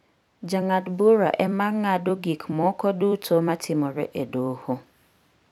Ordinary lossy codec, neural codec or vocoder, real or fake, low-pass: none; vocoder, 44.1 kHz, 128 mel bands every 256 samples, BigVGAN v2; fake; 19.8 kHz